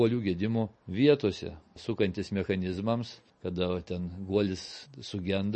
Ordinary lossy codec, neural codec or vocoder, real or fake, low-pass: MP3, 32 kbps; none; real; 9.9 kHz